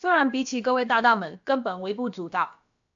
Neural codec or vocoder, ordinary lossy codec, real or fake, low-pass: codec, 16 kHz, about 1 kbps, DyCAST, with the encoder's durations; AAC, 64 kbps; fake; 7.2 kHz